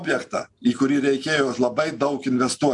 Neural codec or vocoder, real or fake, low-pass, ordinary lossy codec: none; real; 10.8 kHz; MP3, 64 kbps